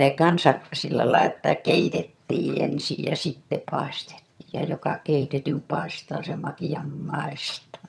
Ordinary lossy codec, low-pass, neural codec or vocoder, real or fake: none; none; vocoder, 22.05 kHz, 80 mel bands, HiFi-GAN; fake